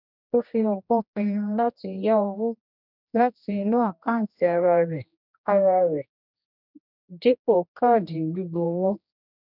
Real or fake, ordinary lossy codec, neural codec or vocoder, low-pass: fake; none; codec, 16 kHz, 1 kbps, X-Codec, HuBERT features, trained on general audio; 5.4 kHz